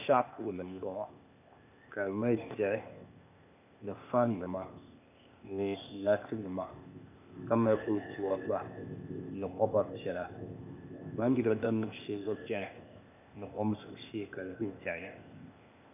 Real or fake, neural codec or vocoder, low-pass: fake; codec, 16 kHz, 0.8 kbps, ZipCodec; 3.6 kHz